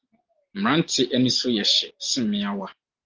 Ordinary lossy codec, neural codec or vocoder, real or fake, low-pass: Opus, 24 kbps; none; real; 7.2 kHz